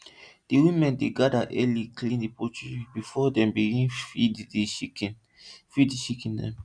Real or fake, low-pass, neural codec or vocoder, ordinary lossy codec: real; 9.9 kHz; none; none